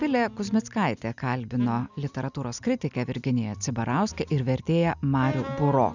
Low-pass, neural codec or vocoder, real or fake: 7.2 kHz; none; real